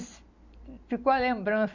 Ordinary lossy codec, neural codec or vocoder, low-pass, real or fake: none; none; 7.2 kHz; real